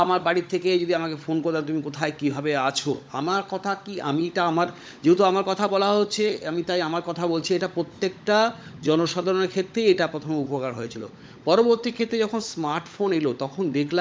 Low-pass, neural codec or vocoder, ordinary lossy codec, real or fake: none; codec, 16 kHz, 16 kbps, FunCodec, trained on LibriTTS, 50 frames a second; none; fake